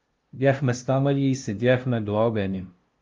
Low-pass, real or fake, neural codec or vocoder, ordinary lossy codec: 7.2 kHz; fake; codec, 16 kHz, 0.5 kbps, FunCodec, trained on LibriTTS, 25 frames a second; Opus, 32 kbps